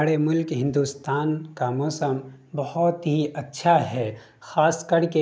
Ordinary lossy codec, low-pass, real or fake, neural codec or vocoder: none; none; real; none